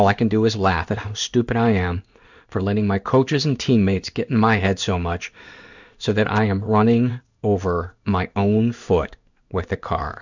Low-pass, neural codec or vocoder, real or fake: 7.2 kHz; codec, 16 kHz in and 24 kHz out, 1 kbps, XY-Tokenizer; fake